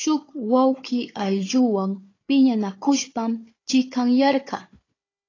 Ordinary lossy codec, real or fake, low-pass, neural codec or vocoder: AAC, 32 kbps; fake; 7.2 kHz; codec, 16 kHz, 4 kbps, FunCodec, trained on Chinese and English, 50 frames a second